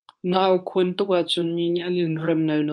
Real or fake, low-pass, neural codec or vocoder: fake; 10.8 kHz; codec, 24 kHz, 0.9 kbps, WavTokenizer, medium speech release version 2